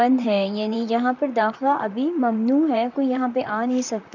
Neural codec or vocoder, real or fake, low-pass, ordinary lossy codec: vocoder, 44.1 kHz, 128 mel bands, Pupu-Vocoder; fake; 7.2 kHz; none